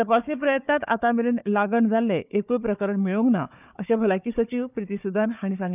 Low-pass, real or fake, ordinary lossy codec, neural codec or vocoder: 3.6 kHz; fake; none; codec, 16 kHz, 4 kbps, FunCodec, trained on Chinese and English, 50 frames a second